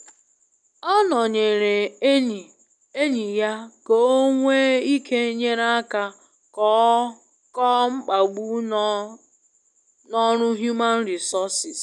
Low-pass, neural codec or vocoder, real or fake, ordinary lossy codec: 10.8 kHz; none; real; none